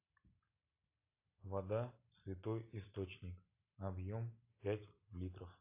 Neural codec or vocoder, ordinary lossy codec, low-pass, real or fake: none; AAC, 32 kbps; 3.6 kHz; real